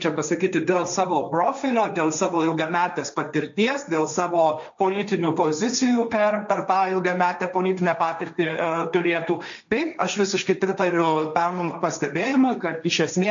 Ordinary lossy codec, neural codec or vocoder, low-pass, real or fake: MP3, 64 kbps; codec, 16 kHz, 1.1 kbps, Voila-Tokenizer; 7.2 kHz; fake